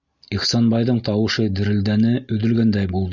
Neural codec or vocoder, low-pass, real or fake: none; 7.2 kHz; real